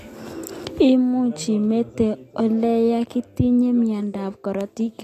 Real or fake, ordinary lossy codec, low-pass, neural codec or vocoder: real; AAC, 48 kbps; 14.4 kHz; none